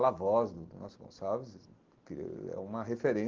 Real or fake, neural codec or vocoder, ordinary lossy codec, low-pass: real; none; Opus, 16 kbps; 7.2 kHz